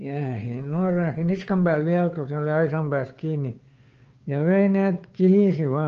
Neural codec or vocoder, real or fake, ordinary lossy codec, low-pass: codec, 16 kHz, 2 kbps, FunCodec, trained on Chinese and English, 25 frames a second; fake; Opus, 24 kbps; 7.2 kHz